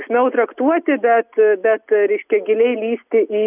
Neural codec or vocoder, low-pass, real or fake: none; 3.6 kHz; real